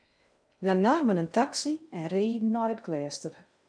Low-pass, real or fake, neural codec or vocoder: 9.9 kHz; fake; codec, 16 kHz in and 24 kHz out, 0.6 kbps, FocalCodec, streaming, 2048 codes